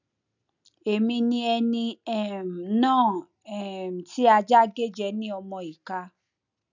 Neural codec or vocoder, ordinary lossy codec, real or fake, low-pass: none; none; real; 7.2 kHz